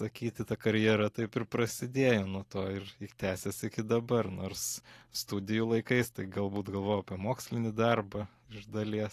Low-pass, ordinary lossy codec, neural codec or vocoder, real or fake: 14.4 kHz; AAC, 48 kbps; none; real